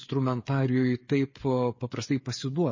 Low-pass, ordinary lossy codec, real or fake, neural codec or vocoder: 7.2 kHz; MP3, 32 kbps; fake; codec, 16 kHz, 8 kbps, FreqCodec, smaller model